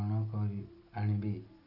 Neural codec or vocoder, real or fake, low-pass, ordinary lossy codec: none; real; 5.4 kHz; none